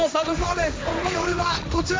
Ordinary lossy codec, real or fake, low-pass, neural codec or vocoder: none; fake; none; codec, 16 kHz, 1.1 kbps, Voila-Tokenizer